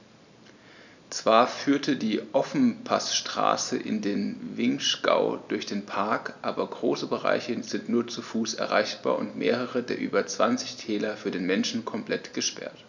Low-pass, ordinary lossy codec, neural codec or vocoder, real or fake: 7.2 kHz; none; none; real